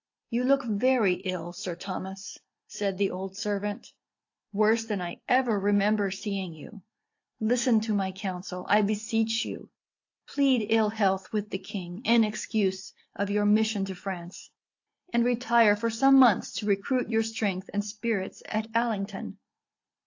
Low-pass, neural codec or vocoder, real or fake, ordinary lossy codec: 7.2 kHz; none; real; AAC, 48 kbps